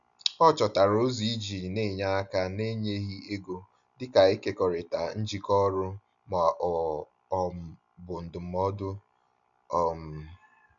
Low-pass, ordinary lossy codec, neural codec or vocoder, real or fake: 7.2 kHz; none; none; real